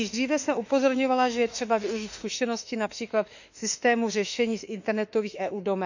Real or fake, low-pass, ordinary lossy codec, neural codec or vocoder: fake; 7.2 kHz; none; autoencoder, 48 kHz, 32 numbers a frame, DAC-VAE, trained on Japanese speech